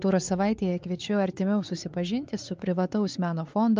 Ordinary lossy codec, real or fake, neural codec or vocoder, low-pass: Opus, 24 kbps; fake; codec, 16 kHz, 4 kbps, X-Codec, WavLM features, trained on Multilingual LibriSpeech; 7.2 kHz